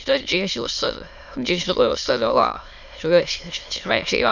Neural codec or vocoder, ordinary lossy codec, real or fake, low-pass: autoencoder, 22.05 kHz, a latent of 192 numbers a frame, VITS, trained on many speakers; none; fake; 7.2 kHz